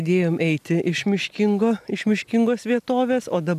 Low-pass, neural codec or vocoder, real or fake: 14.4 kHz; none; real